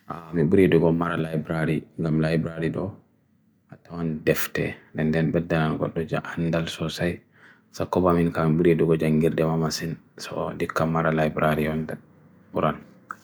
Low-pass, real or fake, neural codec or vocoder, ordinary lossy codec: none; real; none; none